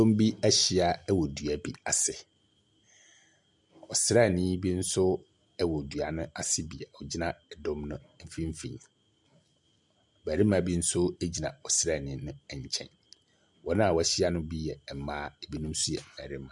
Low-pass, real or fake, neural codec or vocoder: 10.8 kHz; real; none